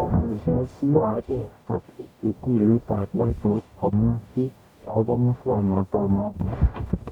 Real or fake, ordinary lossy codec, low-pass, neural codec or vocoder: fake; none; 19.8 kHz; codec, 44.1 kHz, 0.9 kbps, DAC